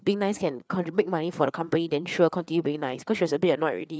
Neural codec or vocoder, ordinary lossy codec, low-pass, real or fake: codec, 16 kHz, 4 kbps, FreqCodec, larger model; none; none; fake